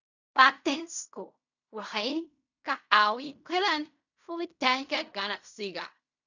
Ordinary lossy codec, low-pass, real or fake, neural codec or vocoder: none; 7.2 kHz; fake; codec, 16 kHz in and 24 kHz out, 0.4 kbps, LongCat-Audio-Codec, fine tuned four codebook decoder